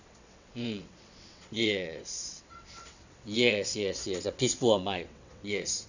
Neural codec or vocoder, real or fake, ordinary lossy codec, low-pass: vocoder, 22.05 kHz, 80 mel bands, WaveNeXt; fake; none; 7.2 kHz